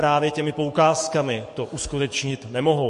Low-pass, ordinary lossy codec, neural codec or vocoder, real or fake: 14.4 kHz; MP3, 48 kbps; codec, 44.1 kHz, 7.8 kbps, DAC; fake